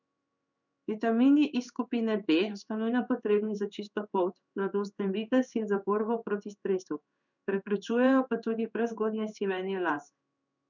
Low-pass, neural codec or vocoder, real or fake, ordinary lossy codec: 7.2 kHz; codec, 16 kHz in and 24 kHz out, 1 kbps, XY-Tokenizer; fake; none